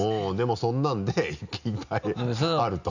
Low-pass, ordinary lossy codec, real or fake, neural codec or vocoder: 7.2 kHz; none; real; none